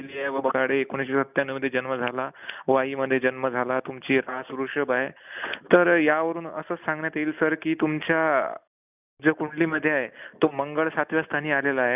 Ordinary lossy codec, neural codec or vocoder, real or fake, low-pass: none; none; real; 3.6 kHz